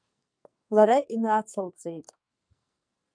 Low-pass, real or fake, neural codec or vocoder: 9.9 kHz; fake; codec, 44.1 kHz, 2.6 kbps, SNAC